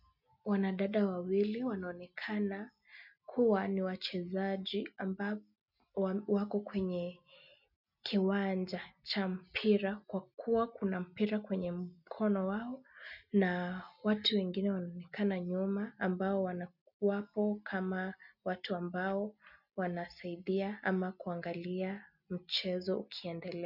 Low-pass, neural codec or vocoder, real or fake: 5.4 kHz; none; real